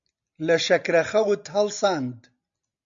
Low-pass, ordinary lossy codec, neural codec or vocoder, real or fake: 7.2 kHz; MP3, 64 kbps; none; real